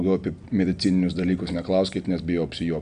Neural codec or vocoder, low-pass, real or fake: none; 9.9 kHz; real